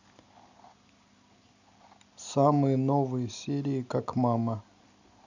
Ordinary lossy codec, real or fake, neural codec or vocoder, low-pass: none; real; none; 7.2 kHz